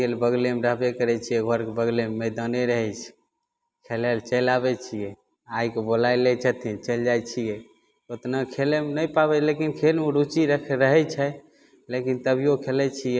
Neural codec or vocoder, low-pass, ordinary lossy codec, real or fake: none; none; none; real